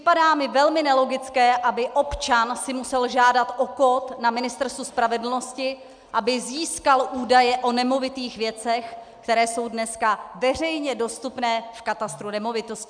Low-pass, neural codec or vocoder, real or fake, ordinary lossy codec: 9.9 kHz; none; real; MP3, 96 kbps